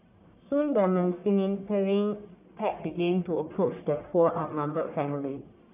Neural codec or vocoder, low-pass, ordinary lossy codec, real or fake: codec, 44.1 kHz, 1.7 kbps, Pupu-Codec; 3.6 kHz; none; fake